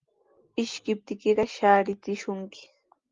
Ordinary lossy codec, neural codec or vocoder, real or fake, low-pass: Opus, 32 kbps; none; real; 7.2 kHz